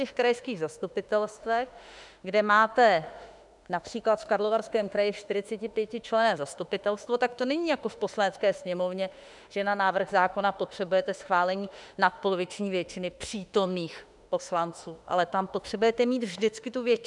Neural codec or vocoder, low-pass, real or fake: autoencoder, 48 kHz, 32 numbers a frame, DAC-VAE, trained on Japanese speech; 10.8 kHz; fake